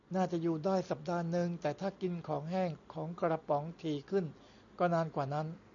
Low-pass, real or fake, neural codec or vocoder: 7.2 kHz; real; none